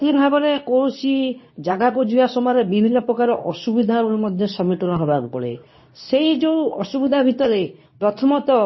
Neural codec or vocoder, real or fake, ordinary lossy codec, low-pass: codec, 24 kHz, 0.9 kbps, WavTokenizer, medium speech release version 2; fake; MP3, 24 kbps; 7.2 kHz